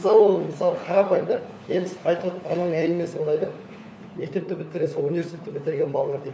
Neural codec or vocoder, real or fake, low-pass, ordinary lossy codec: codec, 16 kHz, 4 kbps, FunCodec, trained on LibriTTS, 50 frames a second; fake; none; none